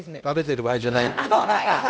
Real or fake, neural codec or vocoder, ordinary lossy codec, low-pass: fake; codec, 16 kHz, 1 kbps, X-Codec, HuBERT features, trained on LibriSpeech; none; none